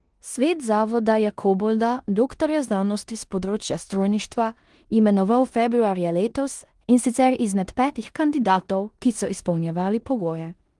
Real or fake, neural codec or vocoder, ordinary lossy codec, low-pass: fake; codec, 16 kHz in and 24 kHz out, 0.9 kbps, LongCat-Audio-Codec, four codebook decoder; Opus, 24 kbps; 10.8 kHz